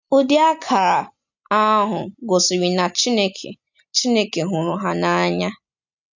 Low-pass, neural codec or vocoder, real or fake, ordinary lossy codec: 7.2 kHz; none; real; none